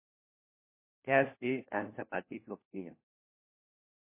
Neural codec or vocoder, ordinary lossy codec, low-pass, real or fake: codec, 16 kHz, 1 kbps, FunCodec, trained on LibriTTS, 50 frames a second; MP3, 24 kbps; 3.6 kHz; fake